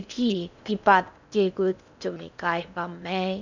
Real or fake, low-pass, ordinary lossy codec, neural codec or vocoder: fake; 7.2 kHz; none; codec, 16 kHz in and 24 kHz out, 0.6 kbps, FocalCodec, streaming, 4096 codes